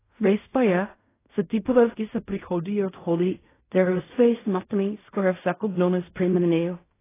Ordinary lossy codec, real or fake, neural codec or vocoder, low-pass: AAC, 16 kbps; fake; codec, 16 kHz in and 24 kHz out, 0.4 kbps, LongCat-Audio-Codec, fine tuned four codebook decoder; 3.6 kHz